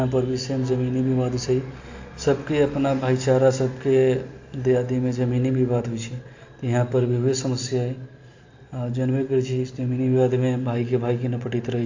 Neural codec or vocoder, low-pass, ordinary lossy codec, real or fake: none; 7.2 kHz; AAC, 48 kbps; real